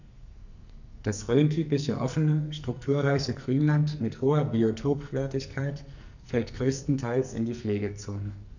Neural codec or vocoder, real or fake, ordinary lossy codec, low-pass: codec, 44.1 kHz, 2.6 kbps, SNAC; fake; none; 7.2 kHz